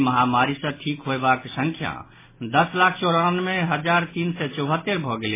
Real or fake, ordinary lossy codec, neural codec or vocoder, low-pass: real; MP3, 16 kbps; none; 3.6 kHz